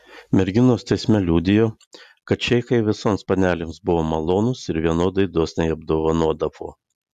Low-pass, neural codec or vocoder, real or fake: 14.4 kHz; none; real